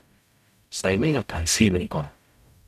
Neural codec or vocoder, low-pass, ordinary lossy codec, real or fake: codec, 44.1 kHz, 0.9 kbps, DAC; 14.4 kHz; none; fake